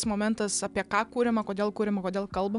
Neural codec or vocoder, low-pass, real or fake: none; 10.8 kHz; real